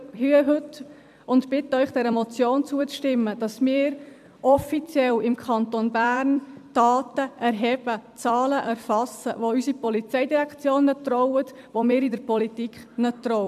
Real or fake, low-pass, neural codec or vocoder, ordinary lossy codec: fake; 14.4 kHz; vocoder, 44.1 kHz, 128 mel bands every 256 samples, BigVGAN v2; MP3, 96 kbps